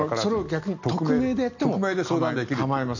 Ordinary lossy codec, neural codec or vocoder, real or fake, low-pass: none; none; real; 7.2 kHz